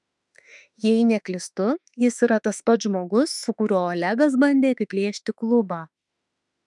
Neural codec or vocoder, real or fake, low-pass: autoencoder, 48 kHz, 32 numbers a frame, DAC-VAE, trained on Japanese speech; fake; 10.8 kHz